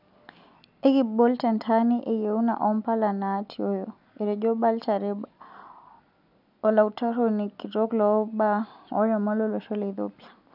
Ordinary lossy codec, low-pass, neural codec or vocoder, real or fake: none; 5.4 kHz; none; real